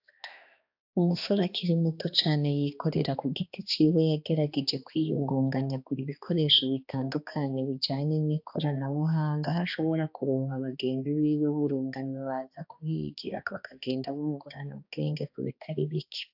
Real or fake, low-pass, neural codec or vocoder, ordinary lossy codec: fake; 5.4 kHz; codec, 16 kHz, 2 kbps, X-Codec, HuBERT features, trained on balanced general audio; AAC, 48 kbps